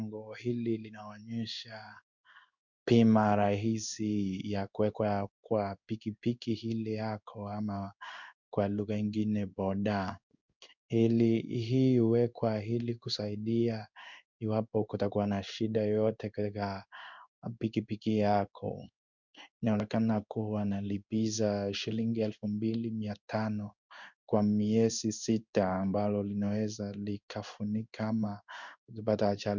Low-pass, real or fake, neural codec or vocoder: 7.2 kHz; fake; codec, 16 kHz in and 24 kHz out, 1 kbps, XY-Tokenizer